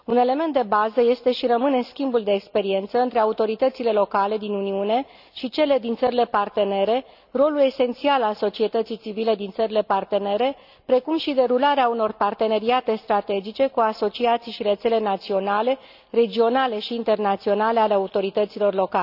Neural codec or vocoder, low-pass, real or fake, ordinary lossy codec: none; 5.4 kHz; real; none